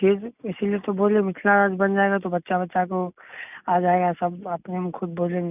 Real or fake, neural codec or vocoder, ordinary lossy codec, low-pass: real; none; none; 3.6 kHz